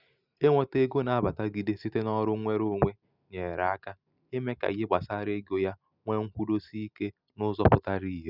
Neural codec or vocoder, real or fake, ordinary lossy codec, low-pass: none; real; none; 5.4 kHz